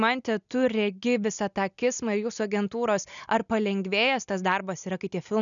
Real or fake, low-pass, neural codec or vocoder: real; 7.2 kHz; none